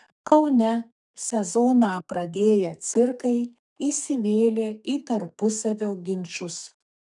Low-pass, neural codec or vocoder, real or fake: 10.8 kHz; codec, 32 kHz, 1.9 kbps, SNAC; fake